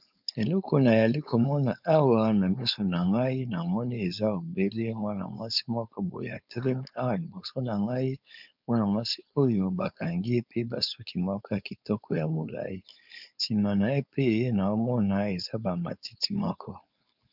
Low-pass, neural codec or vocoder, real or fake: 5.4 kHz; codec, 16 kHz, 4.8 kbps, FACodec; fake